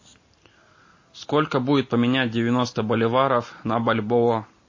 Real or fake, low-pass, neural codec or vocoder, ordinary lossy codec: real; 7.2 kHz; none; MP3, 32 kbps